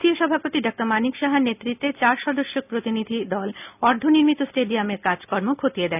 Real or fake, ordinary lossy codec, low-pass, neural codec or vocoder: real; none; 3.6 kHz; none